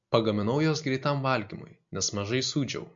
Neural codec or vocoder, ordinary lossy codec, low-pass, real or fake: none; MP3, 48 kbps; 7.2 kHz; real